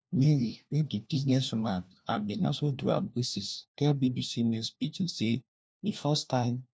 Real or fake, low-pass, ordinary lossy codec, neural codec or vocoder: fake; none; none; codec, 16 kHz, 1 kbps, FunCodec, trained on LibriTTS, 50 frames a second